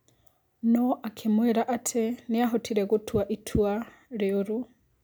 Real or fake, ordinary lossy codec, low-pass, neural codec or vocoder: real; none; none; none